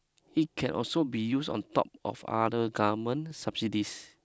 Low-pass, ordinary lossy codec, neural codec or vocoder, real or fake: none; none; none; real